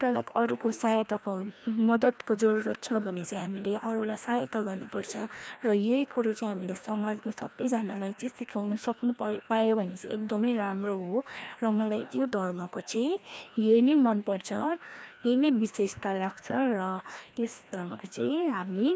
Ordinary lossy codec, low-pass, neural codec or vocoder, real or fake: none; none; codec, 16 kHz, 1 kbps, FreqCodec, larger model; fake